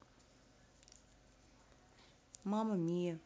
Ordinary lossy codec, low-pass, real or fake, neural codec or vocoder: none; none; real; none